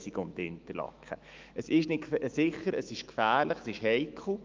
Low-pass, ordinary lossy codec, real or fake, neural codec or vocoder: 7.2 kHz; Opus, 24 kbps; fake; autoencoder, 48 kHz, 128 numbers a frame, DAC-VAE, trained on Japanese speech